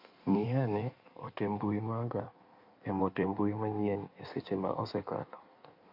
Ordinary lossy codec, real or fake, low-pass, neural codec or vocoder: MP3, 32 kbps; fake; 5.4 kHz; codec, 16 kHz in and 24 kHz out, 1.1 kbps, FireRedTTS-2 codec